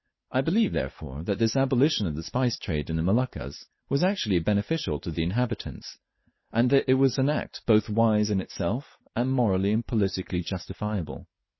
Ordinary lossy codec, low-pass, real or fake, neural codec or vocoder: MP3, 24 kbps; 7.2 kHz; real; none